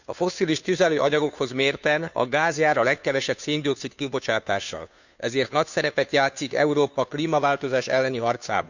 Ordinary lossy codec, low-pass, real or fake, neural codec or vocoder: none; 7.2 kHz; fake; codec, 16 kHz, 2 kbps, FunCodec, trained on Chinese and English, 25 frames a second